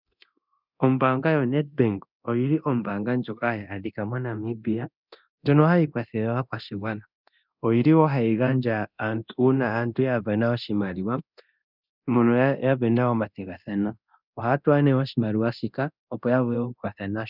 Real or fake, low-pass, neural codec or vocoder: fake; 5.4 kHz; codec, 24 kHz, 0.9 kbps, DualCodec